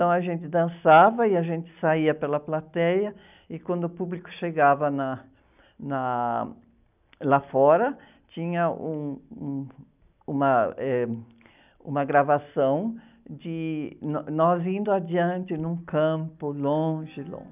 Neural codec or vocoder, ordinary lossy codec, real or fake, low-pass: none; none; real; 3.6 kHz